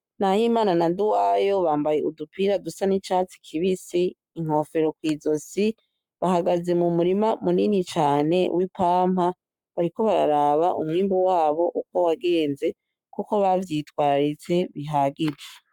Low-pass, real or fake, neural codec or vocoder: 19.8 kHz; fake; codec, 44.1 kHz, 7.8 kbps, Pupu-Codec